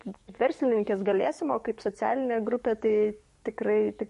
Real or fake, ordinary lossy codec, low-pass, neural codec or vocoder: fake; MP3, 48 kbps; 14.4 kHz; codec, 44.1 kHz, 7.8 kbps, DAC